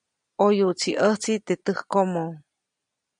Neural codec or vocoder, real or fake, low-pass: none; real; 9.9 kHz